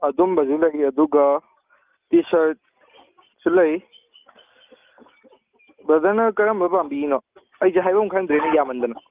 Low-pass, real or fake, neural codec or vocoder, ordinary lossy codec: 3.6 kHz; real; none; Opus, 24 kbps